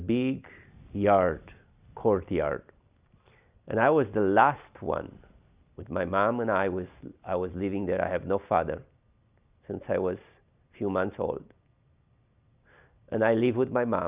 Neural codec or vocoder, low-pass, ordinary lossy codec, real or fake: none; 3.6 kHz; Opus, 64 kbps; real